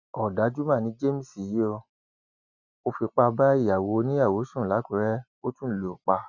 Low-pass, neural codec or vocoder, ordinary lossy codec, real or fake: 7.2 kHz; none; none; real